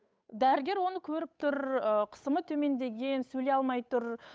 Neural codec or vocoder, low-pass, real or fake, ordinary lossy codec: codec, 16 kHz, 8 kbps, FunCodec, trained on Chinese and English, 25 frames a second; none; fake; none